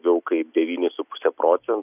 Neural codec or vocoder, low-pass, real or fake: none; 3.6 kHz; real